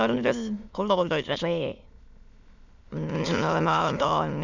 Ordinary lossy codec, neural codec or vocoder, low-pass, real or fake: none; autoencoder, 22.05 kHz, a latent of 192 numbers a frame, VITS, trained on many speakers; 7.2 kHz; fake